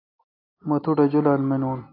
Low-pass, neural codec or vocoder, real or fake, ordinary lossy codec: 5.4 kHz; none; real; AAC, 24 kbps